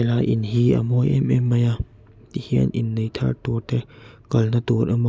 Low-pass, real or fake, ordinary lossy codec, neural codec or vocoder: none; real; none; none